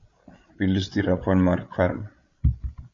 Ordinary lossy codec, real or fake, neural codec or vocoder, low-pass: MP3, 48 kbps; fake; codec, 16 kHz, 16 kbps, FreqCodec, larger model; 7.2 kHz